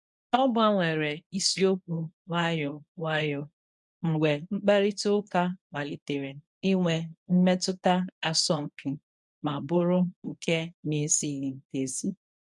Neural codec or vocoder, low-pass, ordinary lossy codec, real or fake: codec, 24 kHz, 0.9 kbps, WavTokenizer, medium speech release version 1; 10.8 kHz; none; fake